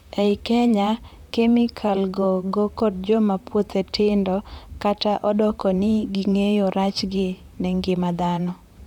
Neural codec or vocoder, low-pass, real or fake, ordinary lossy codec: vocoder, 44.1 kHz, 128 mel bands, Pupu-Vocoder; 19.8 kHz; fake; none